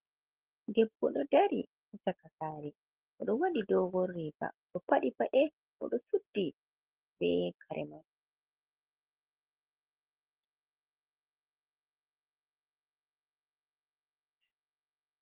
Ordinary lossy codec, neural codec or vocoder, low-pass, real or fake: Opus, 16 kbps; none; 3.6 kHz; real